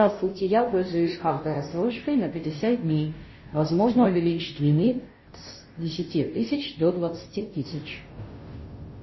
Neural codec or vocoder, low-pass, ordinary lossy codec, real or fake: codec, 16 kHz, 0.5 kbps, FunCodec, trained on Chinese and English, 25 frames a second; 7.2 kHz; MP3, 24 kbps; fake